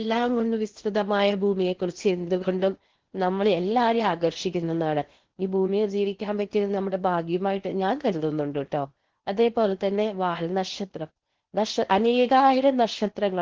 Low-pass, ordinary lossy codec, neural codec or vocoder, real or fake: 7.2 kHz; Opus, 16 kbps; codec, 16 kHz in and 24 kHz out, 0.8 kbps, FocalCodec, streaming, 65536 codes; fake